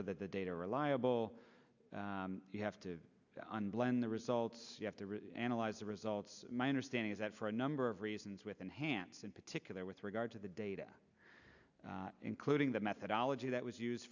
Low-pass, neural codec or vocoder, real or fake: 7.2 kHz; none; real